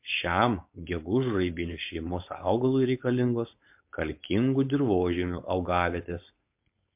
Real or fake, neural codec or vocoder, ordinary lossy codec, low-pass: fake; codec, 16 kHz, 4.8 kbps, FACodec; MP3, 32 kbps; 3.6 kHz